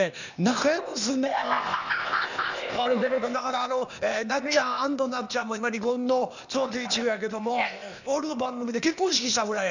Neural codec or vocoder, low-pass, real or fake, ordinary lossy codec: codec, 16 kHz, 0.8 kbps, ZipCodec; 7.2 kHz; fake; none